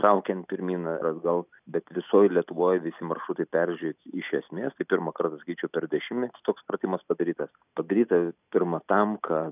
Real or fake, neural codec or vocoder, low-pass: real; none; 3.6 kHz